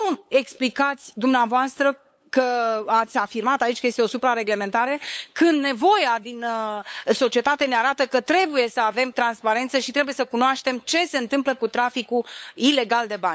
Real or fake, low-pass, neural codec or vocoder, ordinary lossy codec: fake; none; codec, 16 kHz, 8 kbps, FunCodec, trained on LibriTTS, 25 frames a second; none